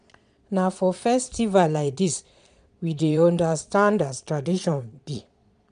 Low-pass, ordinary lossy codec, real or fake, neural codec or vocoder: 9.9 kHz; none; fake; vocoder, 22.05 kHz, 80 mel bands, Vocos